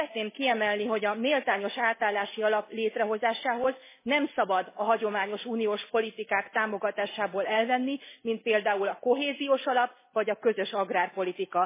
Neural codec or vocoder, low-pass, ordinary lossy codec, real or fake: none; 3.6 kHz; MP3, 16 kbps; real